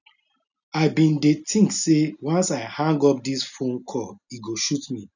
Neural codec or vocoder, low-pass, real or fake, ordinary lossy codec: none; 7.2 kHz; real; none